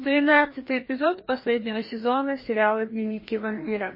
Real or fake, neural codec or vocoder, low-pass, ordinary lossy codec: fake; codec, 16 kHz, 1 kbps, FreqCodec, larger model; 5.4 kHz; MP3, 24 kbps